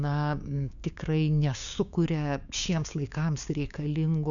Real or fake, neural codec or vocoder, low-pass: fake; codec, 16 kHz, 6 kbps, DAC; 7.2 kHz